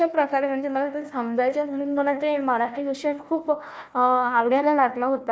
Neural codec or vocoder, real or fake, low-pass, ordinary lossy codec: codec, 16 kHz, 1 kbps, FunCodec, trained on Chinese and English, 50 frames a second; fake; none; none